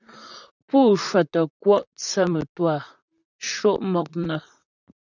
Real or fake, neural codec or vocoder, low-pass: fake; vocoder, 44.1 kHz, 128 mel bands, Pupu-Vocoder; 7.2 kHz